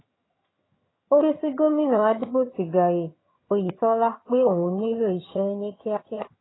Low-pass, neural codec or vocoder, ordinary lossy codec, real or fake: 7.2 kHz; vocoder, 22.05 kHz, 80 mel bands, HiFi-GAN; AAC, 16 kbps; fake